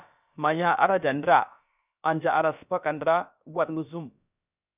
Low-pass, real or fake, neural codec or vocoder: 3.6 kHz; fake; codec, 16 kHz, about 1 kbps, DyCAST, with the encoder's durations